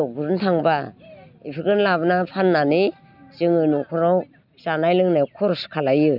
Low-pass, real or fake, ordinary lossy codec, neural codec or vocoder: 5.4 kHz; real; none; none